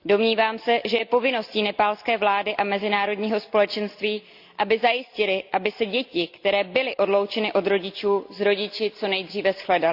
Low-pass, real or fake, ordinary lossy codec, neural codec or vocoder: 5.4 kHz; real; Opus, 64 kbps; none